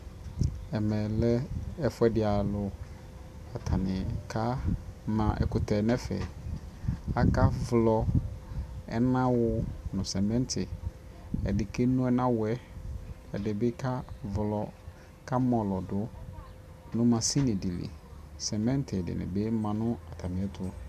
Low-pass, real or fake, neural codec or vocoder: 14.4 kHz; real; none